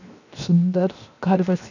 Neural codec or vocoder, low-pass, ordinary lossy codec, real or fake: codec, 16 kHz, 0.7 kbps, FocalCodec; 7.2 kHz; none; fake